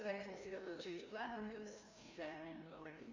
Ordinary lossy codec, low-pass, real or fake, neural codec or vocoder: MP3, 64 kbps; 7.2 kHz; fake; codec, 16 kHz, 1 kbps, FreqCodec, larger model